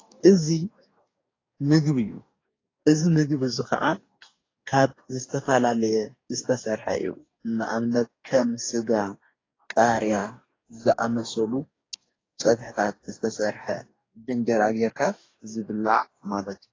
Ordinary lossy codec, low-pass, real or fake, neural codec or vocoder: AAC, 32 kbps; 7.2 kHz; fake; codec, 44.1 kHz, 2.6 kbps, DAC